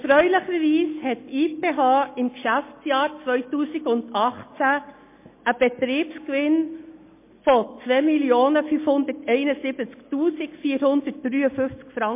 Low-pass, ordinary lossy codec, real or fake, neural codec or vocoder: 3.6 kHz; MP3, 24 kbps; real; none